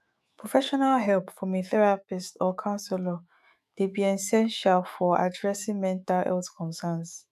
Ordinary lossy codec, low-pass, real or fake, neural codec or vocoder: none; 14.4 kHz; fake; autoencoder, 48 kHz, 128 numbers a frame, DAC-VAE, trained on Japanese speech